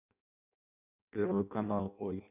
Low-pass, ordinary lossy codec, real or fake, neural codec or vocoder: 3.6 kHz; none; fake; codec, 16 kHz in and 24 kHz out, 0.6 kbps, FireRedTTS-2 codec